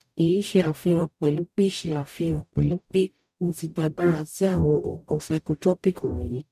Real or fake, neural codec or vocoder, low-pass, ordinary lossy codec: fake; codec, 44.1 kHz, 0.9 kbps, DAC; 14.4 kHz; none